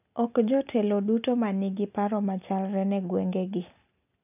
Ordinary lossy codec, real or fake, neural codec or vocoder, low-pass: none; real; none; 3.6 kHz